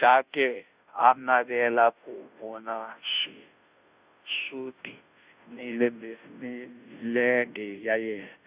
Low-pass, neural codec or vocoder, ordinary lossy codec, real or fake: 3.6 kHz; codec, 16 kHz, 0.5 kbps, FunCodec, trained on Chinese and English, 25 frames a second; Opus, 64 kbps; fake